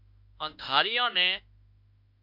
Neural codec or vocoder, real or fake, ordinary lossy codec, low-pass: autoencoder, 48 kHz, 32 numbers a frame, DAC-VAE, trained on Japanese speech; fake; MP3, 48 kbps; 5.4 kHz